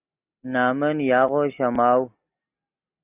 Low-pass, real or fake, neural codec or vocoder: 3.6 kHz; real; none